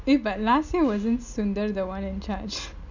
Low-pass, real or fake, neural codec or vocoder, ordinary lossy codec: 7.2 kHz; real; none; none